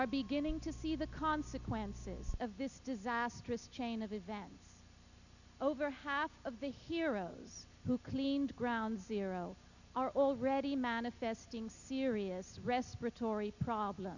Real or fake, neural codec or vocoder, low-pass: real; none; 7.2 kHz